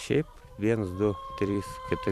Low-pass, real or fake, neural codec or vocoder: 14.4 kHz; fake; vocoder, 44.1 kHz, 128 mel bands every 256 samples, BigVGAN v2